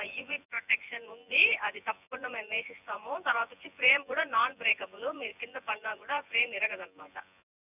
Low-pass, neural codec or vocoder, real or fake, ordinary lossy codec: 3.6 kHz; vocoder, 24 kHz, 100 mel bands, Vocos; fake; none